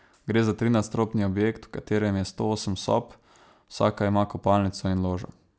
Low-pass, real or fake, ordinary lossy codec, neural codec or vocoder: none; real; none; none